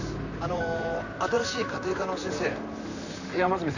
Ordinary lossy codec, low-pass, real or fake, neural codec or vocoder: none; 7.2 kHz; fake; vocoder, 44.1 kHz, 128 mel bands, Pupu-Vocoder